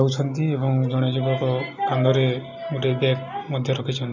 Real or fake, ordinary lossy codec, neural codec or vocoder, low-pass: real; none; none; 7.2 kHz